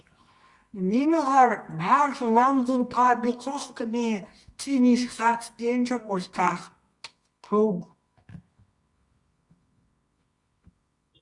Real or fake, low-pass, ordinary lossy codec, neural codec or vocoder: fake; 10.8 kHz; Opus, 64 kbps; codec, 24 kHz, 0.9 kbps, WavTokenizer, medium music audio release